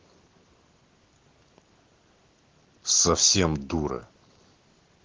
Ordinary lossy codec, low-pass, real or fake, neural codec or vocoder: Opus, 16 kbps; 7.2 kHz; real; none